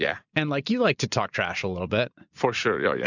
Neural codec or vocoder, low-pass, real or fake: none; 7.2 kHz; real